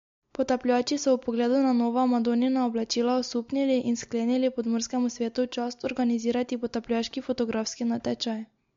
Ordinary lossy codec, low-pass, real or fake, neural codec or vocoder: MP3, 48 kbps; 7.2 kHz; real; none